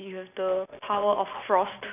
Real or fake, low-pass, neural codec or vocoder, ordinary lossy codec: real; 3.6 kHz; none; none